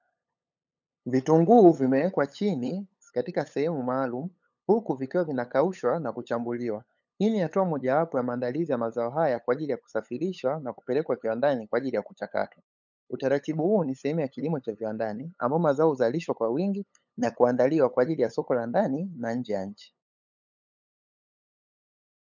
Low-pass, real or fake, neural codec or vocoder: 7.2 kHz; fake; codec, 16 kHz, 8 kbps, FunCodec, trained on LibriTTS, 25 frames a second